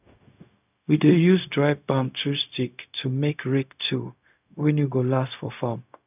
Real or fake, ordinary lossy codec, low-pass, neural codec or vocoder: fake; none; 3.6 kHz; codec, 16 kHz, 0.4 kbps, LongCat-Audio-Codec